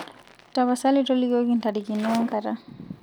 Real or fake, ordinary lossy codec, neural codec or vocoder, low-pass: real; none; none; none